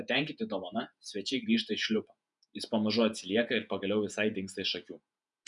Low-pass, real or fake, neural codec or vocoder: 10.8 kHz; fake; vocoder, 48 kHz, 128 mel bands, Vocos